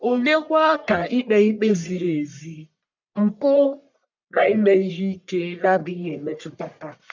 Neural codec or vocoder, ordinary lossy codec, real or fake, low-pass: codec, 44.1 kHz, 1.7 kbps, Pupu-Codec; none; fake; 7.2 kHz